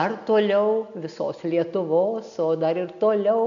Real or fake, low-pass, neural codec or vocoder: real; 7.2 kHz; none